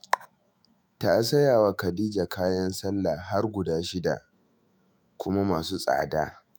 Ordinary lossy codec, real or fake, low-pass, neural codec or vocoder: none; fake; none; autoencoder, 48 kHz, 128 numbers a frame, DAC-VAE, trained on Japanese speech